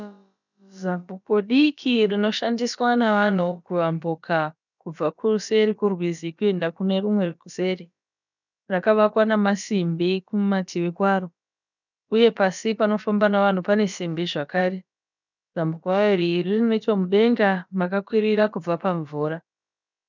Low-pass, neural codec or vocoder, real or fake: 7.2 kHz; codec, 16 kHz, about 1 kbps, DyCAST, with the encoder's durations; fake